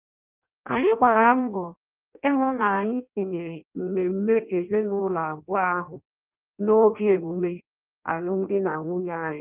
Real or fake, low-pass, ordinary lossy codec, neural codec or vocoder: fake; 3.6 kHz; Opus, 32 kbps; codec, 16 kHz in and 24 kHz out, 0.6 kbps, FireRedTTS-2 codec